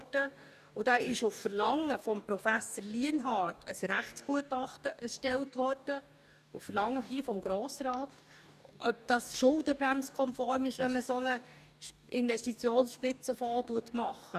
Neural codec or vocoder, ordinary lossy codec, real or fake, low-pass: codec, 44.1 kHz, 2.6 kbps, DAC; none; fake; 14.4 kHz